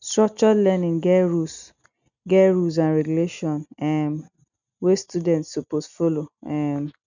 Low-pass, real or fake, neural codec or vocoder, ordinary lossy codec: 7.2 kHz; real; none; none